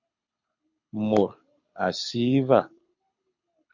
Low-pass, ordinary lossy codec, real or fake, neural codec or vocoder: 7.2 kHz; MP3, 48 kbps; fake; codec, 24 kHz, 6 kbps, HILCodec